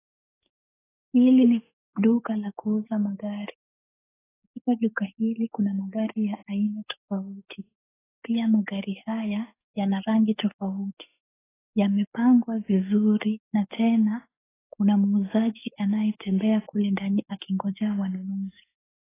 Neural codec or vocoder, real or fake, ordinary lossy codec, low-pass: codec, 44.1 kHz, 7.8 kbps, DAC; fake; AAC, 16 kbps; 3.6 kHz